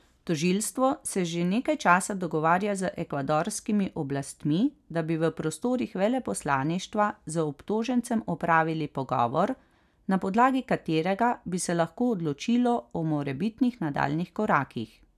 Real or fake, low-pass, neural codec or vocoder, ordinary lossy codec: real; 14.4 kHz; none; none